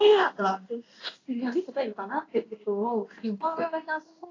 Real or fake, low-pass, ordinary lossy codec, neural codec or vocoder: fake; 7.2 kHz; AAC, 32 kbps; codec, 16 kHz in and 24 kHz out, 1 kbps, XY-Tokenizer